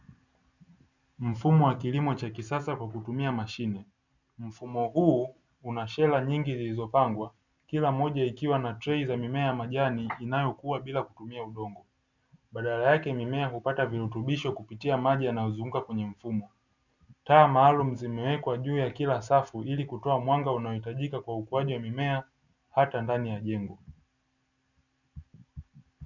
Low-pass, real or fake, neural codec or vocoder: 7.2 kHz; real; none